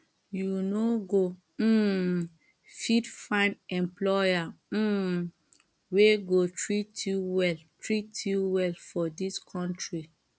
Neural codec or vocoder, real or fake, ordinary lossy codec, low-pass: none; real; none; none